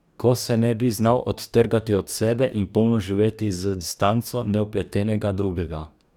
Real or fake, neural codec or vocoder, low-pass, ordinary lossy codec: fake; codec, 44.1 kHz, 2.6 kbps, DAC; 19.8 kHz; none